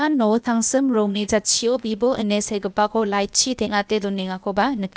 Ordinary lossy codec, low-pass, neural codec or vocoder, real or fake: none; none; codec, 16 kHz, 0.8 kbps, ZipCodec; fake